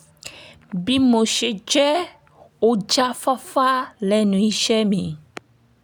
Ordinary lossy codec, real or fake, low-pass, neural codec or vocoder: none; real; none; none